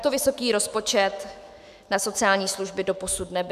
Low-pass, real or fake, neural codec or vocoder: 14.4 kHz; fake; autoencoder, 48 kHz, 128 numbers a frame, DAC-VAE, trained on Japanese speech